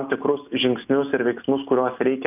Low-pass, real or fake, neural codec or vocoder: 3.6 kHz; real; none